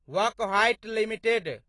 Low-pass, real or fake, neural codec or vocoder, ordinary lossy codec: 10.8 kHz; real; none; AAC, 32 kbps